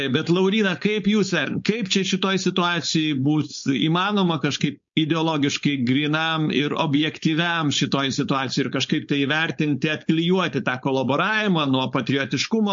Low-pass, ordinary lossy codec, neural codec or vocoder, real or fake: 7.2 kHz; MP3, 48 kbps; codec, 16 kHz, 4.8 kbps, FACodec; fake